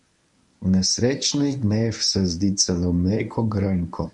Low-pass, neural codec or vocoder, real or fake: 10.8 kHz; codec, 24 kHz, 0.9 kbps, WavTokenizer, medium speech release version 1; fake